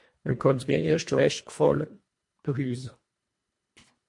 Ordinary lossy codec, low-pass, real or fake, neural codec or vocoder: MP3, 48 kbps; 10.8 kHz; fake; codec, 24 kHz, 1.5 kbps, HILCodec